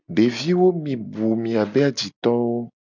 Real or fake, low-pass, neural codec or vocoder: real; 7.2 kHz; none